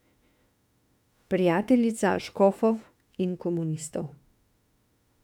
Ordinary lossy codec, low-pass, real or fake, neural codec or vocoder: none; 19.8 kHz; fake; autoencoder, 48 kHz, 32 numbers a frame, DAC-VAE, trained on Japanese speech